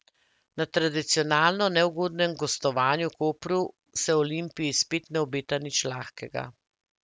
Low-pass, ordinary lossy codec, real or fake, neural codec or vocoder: none; none; real; none